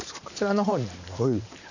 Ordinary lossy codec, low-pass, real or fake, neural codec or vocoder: none; 7.2 kHz; fake; vocoder, 44.1 kHz, 128 mel bands every 512 samples, BigVGAN v2